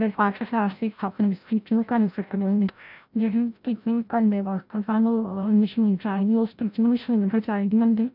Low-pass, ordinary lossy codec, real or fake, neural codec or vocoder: 5.4 kHz; none; fake; codec, 16 kHz, 0.5 kbps, FreqCodec, larger model